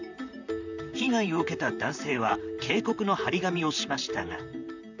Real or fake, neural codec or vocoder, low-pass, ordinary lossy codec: fake; vocoder, 44.1 kHz, 128 mel bands, Pupu-Vocoder; 7.2 kHz; none